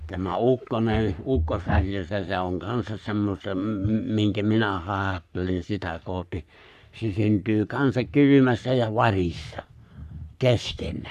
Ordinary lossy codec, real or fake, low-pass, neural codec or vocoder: none; fake; 14.4 kHz; codec, 44.1 kHz, 3.4 kbps, Pupu-Codec